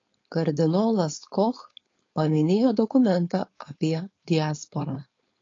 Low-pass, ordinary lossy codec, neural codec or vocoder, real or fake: 7.2 kHz; AAC, 32 kbps; codec, 16 kHz, 4.8 kbps, FACodec; fake